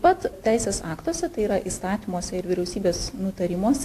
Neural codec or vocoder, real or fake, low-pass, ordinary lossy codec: none; real; 14.4 kHz; AAC, 64 kbps